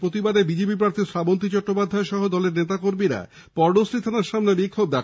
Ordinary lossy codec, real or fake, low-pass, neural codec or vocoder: none; real; none; none